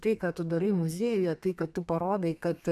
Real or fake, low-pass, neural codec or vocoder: fake; 14.4 kHz; codec, 32 kHz, 1.9 kbps, SNAC